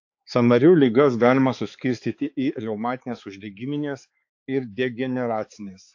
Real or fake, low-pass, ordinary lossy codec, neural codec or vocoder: fake; 7.2 kHz; AAC, 48 kbps; codec, 16 kHz, 4 kbps, X-Codec, HuBERT features, trained on balanced general audio